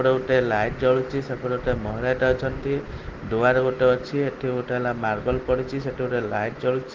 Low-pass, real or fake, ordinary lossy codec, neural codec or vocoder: 7.2 kHz; real; Opus, 16 kbps; none